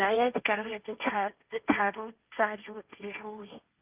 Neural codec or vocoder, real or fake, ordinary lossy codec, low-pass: codec, 16 kHz, 1.1 kbps, Voila-Tokenizer; fake; Opus, 24 kbps; 3.6 kHz